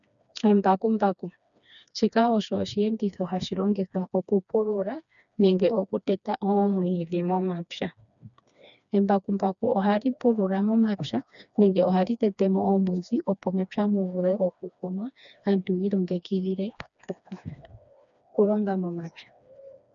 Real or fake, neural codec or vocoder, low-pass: fake; codec, 16 kHz, 2 kbps, FreqCodec, smaller model; 7.2 kHz